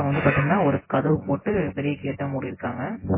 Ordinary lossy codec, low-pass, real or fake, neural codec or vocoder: MP3, 16 kbps; 3.6 kHz; fake; vocoder, 24 kHz, 100 mel bands, Vocos